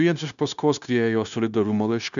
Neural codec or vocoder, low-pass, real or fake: codec, 16 kHz, 0.9 kbps, LongCat-Audio-Codec; 7.2 kHz; fake